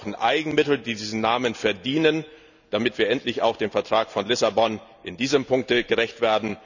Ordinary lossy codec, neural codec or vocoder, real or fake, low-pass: none; none; real; 7.2 kHz